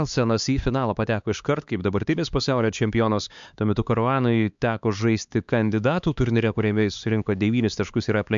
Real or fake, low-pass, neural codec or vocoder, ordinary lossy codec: fake; 7.2 kHz; codec, 16 kHz, 4 kbps, X-Codec, HuBERT features, trained on LibriSpeech; MP3, 64 kbps